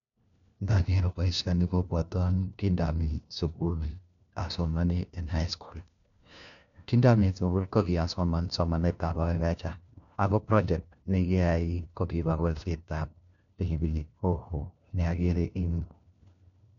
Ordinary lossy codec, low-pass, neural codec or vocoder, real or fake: none; 7.2 kHz; codec, 16 kHz, 1 kbps, FunCodec, trained on LibriTTS, 50 frames a second; fake